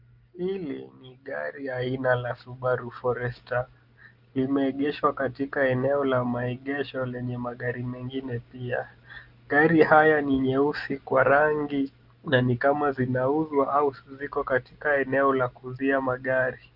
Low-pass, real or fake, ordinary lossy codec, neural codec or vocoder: 5.4 kHz; real; Opus, 32 kbps; none